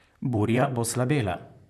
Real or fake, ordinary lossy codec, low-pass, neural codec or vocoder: fake; none; 14.4 kHz; vocoder, 44.1 kHz, 128 mel bands, Pupu-Vocoder